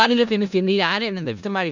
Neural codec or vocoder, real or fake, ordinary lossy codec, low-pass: codec, 16 kHz in and 24 kHz out, 0.4 kbps, LongCat-Audio-Codec, four codebook decoder; fake; none; 7.2 kHz